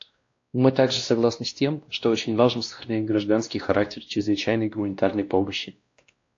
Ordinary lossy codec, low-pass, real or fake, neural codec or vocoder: AAC, 48 kbps; 7.2 kHz; fake; codec, 16 kHz, 1 kbps, X-Codec, WavLM features, trained on Multilingual LibriSpeech